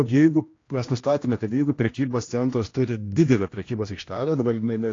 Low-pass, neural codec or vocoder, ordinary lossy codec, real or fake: 7.2 kHz; codec, 16 kHz, 1 kbps, X-Codec, HuBERT features, trained on general audio; AAC, 32 kbps; fake